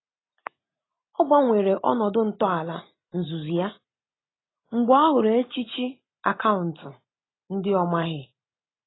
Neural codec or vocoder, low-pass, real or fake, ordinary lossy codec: none; 7.2 kHz; real; AAC, 16 kbps